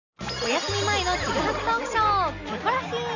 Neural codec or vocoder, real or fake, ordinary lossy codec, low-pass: none; real; none; 7.2 kHz